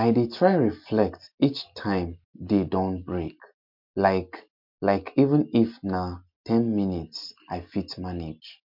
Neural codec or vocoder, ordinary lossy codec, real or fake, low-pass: none; MP3, 48 kbps; real; 5.4 kHz